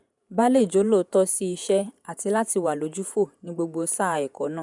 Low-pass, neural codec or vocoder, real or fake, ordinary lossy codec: 10.8 kHz; vocoder, 48 kHz, 128 mel bands, Vocos; fake; none